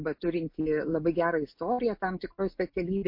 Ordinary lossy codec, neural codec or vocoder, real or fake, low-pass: MP3, 32 kbps; none; real; 5.4 kHz